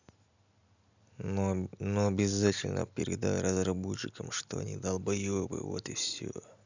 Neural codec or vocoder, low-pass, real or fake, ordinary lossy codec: none; 7.2 kHz; real; none